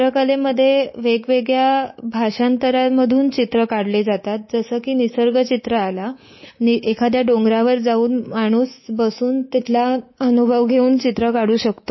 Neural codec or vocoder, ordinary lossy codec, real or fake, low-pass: codec, 24 kHz, 3.1 kbps, DualCodec; MP3, 24 kbps; fake; 7.2 kHz